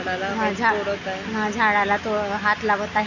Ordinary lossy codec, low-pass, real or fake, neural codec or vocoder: none; 7.2 kHz; real; none